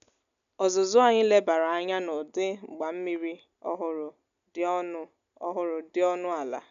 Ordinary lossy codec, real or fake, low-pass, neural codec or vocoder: none; real; 7.2 kHz; none